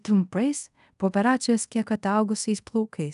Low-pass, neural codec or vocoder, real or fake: 10.8 kHz; codec, 24 kHz, 0.5 kbps, DualCodec; fake